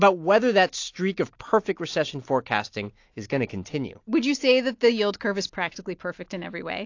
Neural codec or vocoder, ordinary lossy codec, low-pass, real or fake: none; AAC, 48 kbps; 7.2 kHz; real